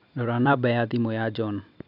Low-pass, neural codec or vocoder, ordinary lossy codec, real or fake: 5.4 kHz; vocoder, 44.1 kHz, 128 mel bands every 256 samples, BigVGAN v2; none; fake